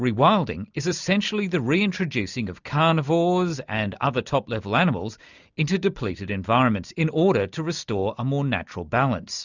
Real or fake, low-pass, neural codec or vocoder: real; 7.2 kHz; none